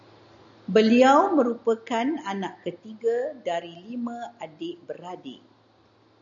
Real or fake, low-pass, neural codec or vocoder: real; 7.2 kHz; none